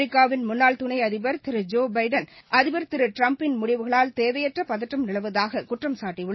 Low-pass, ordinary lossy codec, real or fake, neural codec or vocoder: 7.2 kHz; MP3, 24 kbps; real; none